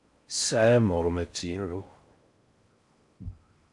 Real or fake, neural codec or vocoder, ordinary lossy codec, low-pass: fake; codec, 16 kHz in and 24 kHz out, 0.6 kbps, FocalCodec, streaming, 4096 codes; AAC, 64 kbps; 10.8 kHz